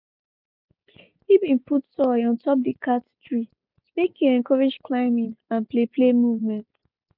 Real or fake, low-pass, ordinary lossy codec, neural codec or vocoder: real; 5.4 kHz; none; none